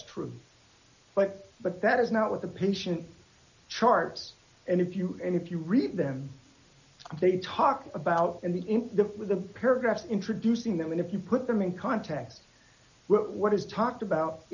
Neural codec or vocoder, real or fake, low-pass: none; real; 7.2 kHz